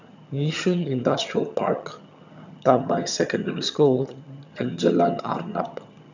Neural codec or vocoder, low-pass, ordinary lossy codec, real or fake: vocoder, 22.05 kHz, 80 mel bands, HiFi-GAN; 7.2 kHz; none; fake